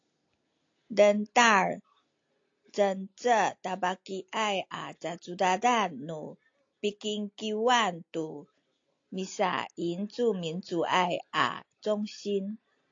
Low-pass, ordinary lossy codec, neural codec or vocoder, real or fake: 7.2 kHz; AAC, 48 kbps; none; real